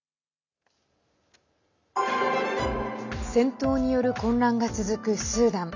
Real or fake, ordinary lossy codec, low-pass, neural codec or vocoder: real; none; 7.2 kHz; none